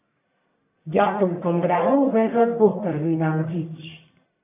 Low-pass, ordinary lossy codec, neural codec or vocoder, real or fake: 3.6 kHz; AAC, 32 kbps; codec, 44.1 kHz, 1.7 kbps, Pupu-Codec; fake